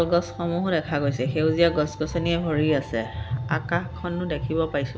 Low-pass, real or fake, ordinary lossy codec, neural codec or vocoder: none; real; none; none